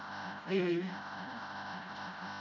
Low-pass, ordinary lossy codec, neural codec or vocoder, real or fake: 7.2 kHz; none; codec, 16 kHz, 0.5 kbps, FreqCodec, smaller model; fake